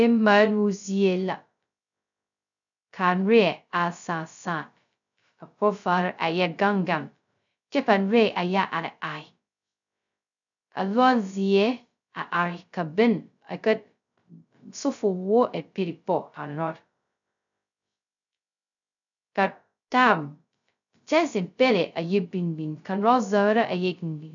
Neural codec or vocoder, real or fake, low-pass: codec, 16 kHz, 0.2 kbps, FocalCodec; fake; 7.2 kHz